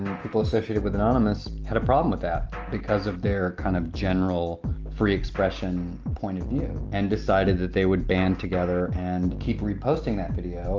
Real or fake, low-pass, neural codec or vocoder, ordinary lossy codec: real; 7.2 kHz; none; Opus, 24 kbps